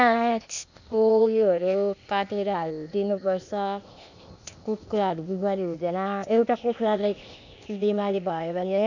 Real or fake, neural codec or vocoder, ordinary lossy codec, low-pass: fake; codec, 16 kHz, 0.8 kbps, ZipCodec; none; 7.2 kHz